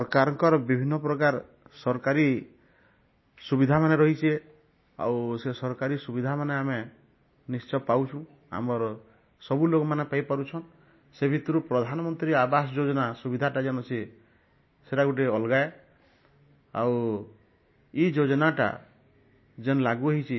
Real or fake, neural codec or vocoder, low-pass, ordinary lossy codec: real; none; 7.2 kHz; MP3, 24 kbps